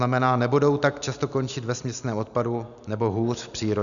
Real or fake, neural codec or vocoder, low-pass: real; none; 7.2 kHz